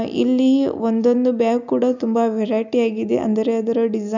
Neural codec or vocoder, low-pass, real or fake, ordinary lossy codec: none; 7.2 kHz; real; none